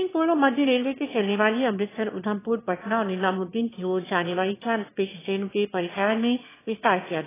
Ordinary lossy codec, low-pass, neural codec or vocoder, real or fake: AAC, 16 kbps; 3.6 kHz; autoencoder, 22.05 kHz, a latent of 192 numbers a frame, VITS, trained on one speaker; fake